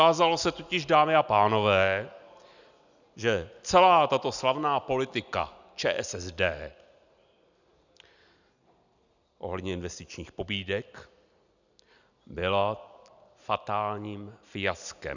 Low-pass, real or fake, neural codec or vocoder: 7.2 kHz; real; none